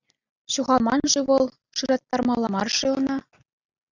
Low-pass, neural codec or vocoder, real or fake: 7.2 kHz; none; real